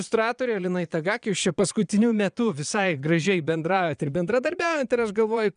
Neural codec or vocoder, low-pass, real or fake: vocoder, 22.05 kHz, 80 mel bands, Vocos; 9.9 kHz; fake